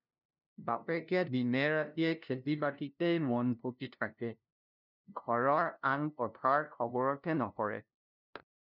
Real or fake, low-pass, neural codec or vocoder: fake; 5.4 kHz; codec, 16 kHz, 0.5 kbps, FunCodec, trained on LibriTTS, 25 frames a second